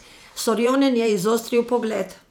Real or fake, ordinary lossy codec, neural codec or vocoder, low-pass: fake; none; vocoder, 44.1 kHz, 128 mel bands every 512 samples, BigVGAN v2; none